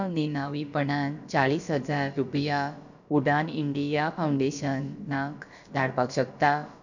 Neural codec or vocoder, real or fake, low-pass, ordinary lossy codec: codec, 16 kHz, about 1 kbps, DyCAST, with the encoder's durations; fake; 7.2 kHz; none